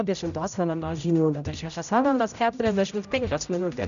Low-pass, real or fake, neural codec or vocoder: 7.2 kHz; fake; codec, 16 kHz, 0.5 kbps, X-Codec, HuBERT features, trained on general audio